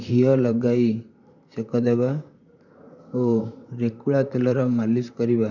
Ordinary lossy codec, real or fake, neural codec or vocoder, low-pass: none; fake; vocoder, 44.1 kHz, 128 mel bands, Pupu-Vocoder; 7.2 kHz